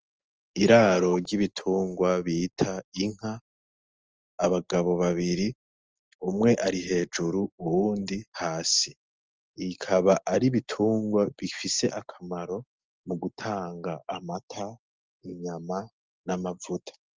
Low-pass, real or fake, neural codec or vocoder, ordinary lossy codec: 7.2 kHz; real; none; Opus, 24 kbps